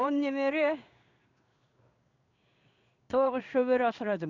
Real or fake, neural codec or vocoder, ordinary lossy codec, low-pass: fake; codec, 16 kHz in and 24 kHz out, 1 kbps, XY-Tokenizer; none; 7.2 kHz